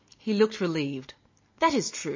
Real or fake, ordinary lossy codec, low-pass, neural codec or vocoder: real; MP3, 32 kbps; 7.2 kHz; none